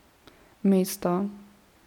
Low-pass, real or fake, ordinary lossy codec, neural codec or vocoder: 19.8 kHz; real; none; none